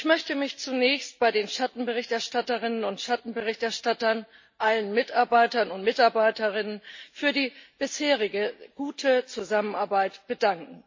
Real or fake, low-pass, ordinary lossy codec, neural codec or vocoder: real; 7.2 kHz; MP3, 32 kbps; none